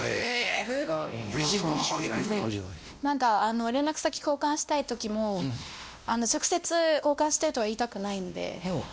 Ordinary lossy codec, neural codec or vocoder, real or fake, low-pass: none; codec, 16 kHz, 1 kbps, X-Codec, WavLM features, trained on Multilingual LibriSpeech; fake; none